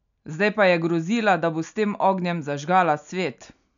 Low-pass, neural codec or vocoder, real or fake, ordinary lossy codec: 7.2 kHz; none; real; none